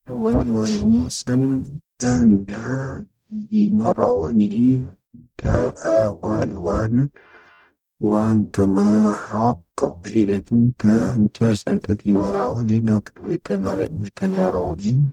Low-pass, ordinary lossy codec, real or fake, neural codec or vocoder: 19.8 kHz; none; fake; codec, 44.1 kHz, 0.9 kbps, DAC